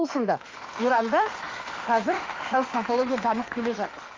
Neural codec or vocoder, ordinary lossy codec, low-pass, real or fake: autoencoder, 48 kHz, 32 numbers a frame, DAC-VAE, trained on Japanese speech; Opus, 24 kbps; 7.2 kHz; fake